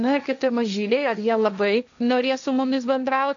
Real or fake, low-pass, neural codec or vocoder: fake; 7.2 kHz; codec, 16 kHz, 1.1 kbps, Voila-Tokenizer